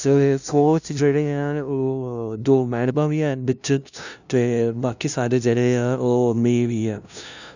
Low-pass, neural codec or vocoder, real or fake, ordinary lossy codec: 7.2 kHz; codec, 16 kHz, 0.5 kbps, FunCodec, trained on LibriTTS, 25 frames a second; fake; none